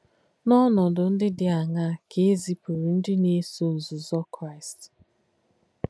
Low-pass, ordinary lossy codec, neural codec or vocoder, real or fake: none; none; none; real